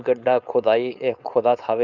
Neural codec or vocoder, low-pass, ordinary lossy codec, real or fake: codec, 16 kHz, 4.8 kbps, FACodec; 7.2 kHz; Opus, 64 kbps; fake